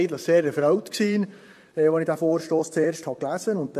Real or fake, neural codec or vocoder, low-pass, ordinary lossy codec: fake; vocoder, 44.1 kHz, 128 mel bands, Pupu-Vocoder; 14.4 kHz; MP3, 64 kbps